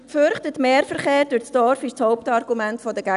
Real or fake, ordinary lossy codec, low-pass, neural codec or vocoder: real; none; 10.8 kHz; none